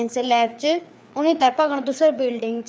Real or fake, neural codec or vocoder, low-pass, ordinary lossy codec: fake; codec, 16 kHz, 4 kbps, FreqCodec, larger model; none; none